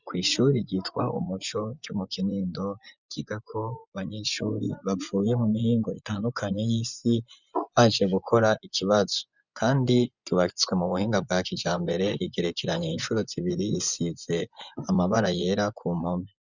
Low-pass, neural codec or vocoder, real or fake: 7.2 kHz; vocoder, 24 kHz, 100 mel bands, Vocos; fake